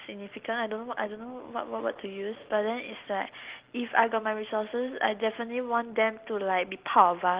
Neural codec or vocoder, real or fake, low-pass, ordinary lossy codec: none; real; 3.6 kHz; Opus, 16 kbps